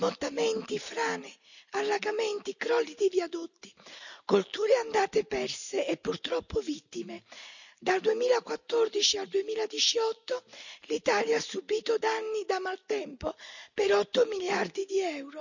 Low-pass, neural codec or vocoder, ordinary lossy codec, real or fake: 7.2 kHz; none; none; real